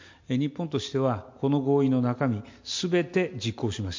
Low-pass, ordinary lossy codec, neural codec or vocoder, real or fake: 7.2 kHz; MP3, 48 kbps; none; real